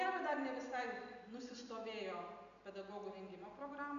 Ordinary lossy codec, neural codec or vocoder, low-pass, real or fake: AAC, 96 kbps; none; 7.2 kHz; real